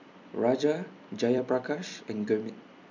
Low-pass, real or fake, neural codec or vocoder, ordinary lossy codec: 7.2 kHz; fake; vocoder, 44.1 kHz, 128 mel bands every 256 samples, BigVGAN v2; MP3, 64 kbps